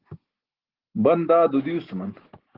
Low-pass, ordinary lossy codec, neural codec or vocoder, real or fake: 5.4 kHz; Opus, 16 kbps; none; real